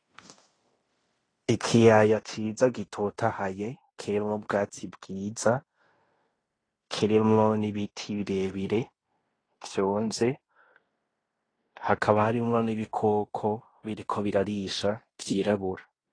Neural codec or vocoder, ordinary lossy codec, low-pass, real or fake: codec, 16 kHz in and 24 kHz out, 0.9 kbps, LongCat-Audio-Codec, fine tuned four codebook decoder; AAC, 32 kbps; 9.9 kHz; fake